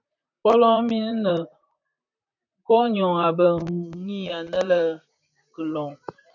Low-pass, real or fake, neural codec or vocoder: 7.2 kHz; fake; vocoder, 44.1 kHz, 128 mel bands, Pupu-Vocoder